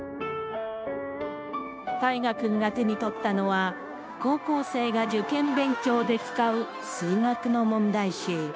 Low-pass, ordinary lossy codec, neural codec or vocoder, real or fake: none; none; codec, 16 kHz, 0.9 kbps, LongCat-Audio-Codec; fake